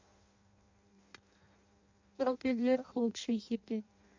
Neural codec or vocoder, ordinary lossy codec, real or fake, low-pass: codec, 16 kHz in and 24 kHz out, 0.6 kbps, FireRedTTS-2 codec; MP3, 64 kbps; fake; 7.2 kHz